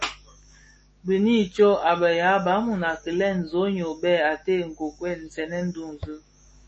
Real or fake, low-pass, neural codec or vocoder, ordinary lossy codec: fake; 10.8 kHz; autoencoder, 48 kHz, 128 numbers a frame, DAC-VAE, trained on Japanese speech; MP3, 32 kbps